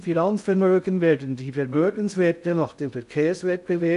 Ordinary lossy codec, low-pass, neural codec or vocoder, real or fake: none; 10.8 kHz; codec, 16 kHz in and 24 kHz out, 0.6 kbps, FocalCodec, streaming, 2048 codes; fake